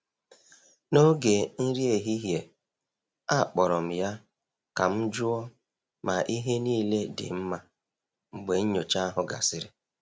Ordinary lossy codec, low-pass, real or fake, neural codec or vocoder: none; none; real; none